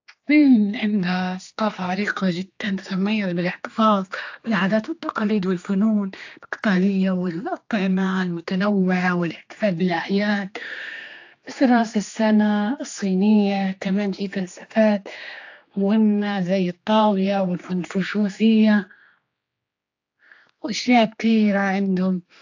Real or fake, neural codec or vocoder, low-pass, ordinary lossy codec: fake; codec, 16 kHz, 2 kbps, X-Codec, HuBERT features, trained on general audio; 7.2 kHz; AAC, 48 kbps